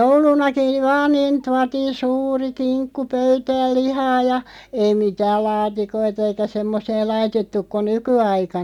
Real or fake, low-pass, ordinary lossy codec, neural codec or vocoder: real; 19.8 kHz; none; none